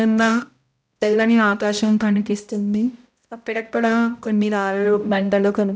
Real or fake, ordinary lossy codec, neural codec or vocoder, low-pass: fake; none; codec, 16 kHz, 0.5 kbps, X-Codec, HuBERT features, trained on balanced general audio; none